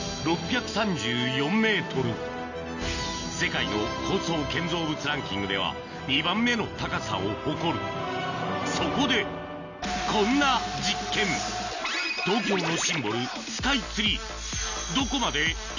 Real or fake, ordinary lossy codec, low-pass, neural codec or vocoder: real; none; 7.2 kHz; none